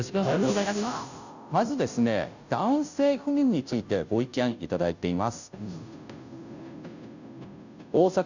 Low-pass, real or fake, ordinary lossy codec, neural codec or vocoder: 7.2 kHz; fake; none; codec, 16 kHz, 0.5 kbps, FunCodec, trained on Chinese and English, 25 frames a second